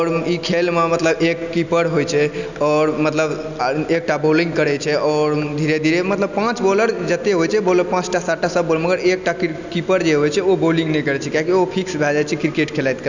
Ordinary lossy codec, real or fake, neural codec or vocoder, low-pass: none; real; none; 7.2 kHz